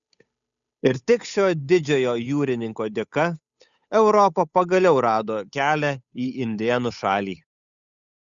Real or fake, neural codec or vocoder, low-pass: fake; codec, 16 kHz, 8 kbps, FunCodec, trained on Chinese and English, 25 frames a second; 7.2 kHz